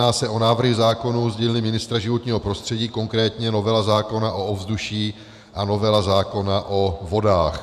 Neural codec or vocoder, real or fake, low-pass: vocoder, 48 kHz, 128 mel bands, Vocos; fake; 14.4 kHz